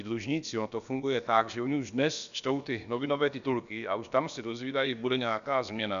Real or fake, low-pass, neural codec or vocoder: fake; 7.2 kHz; codec, 16 kHz, about 1 kbps, DyCAST, with the encoder's durations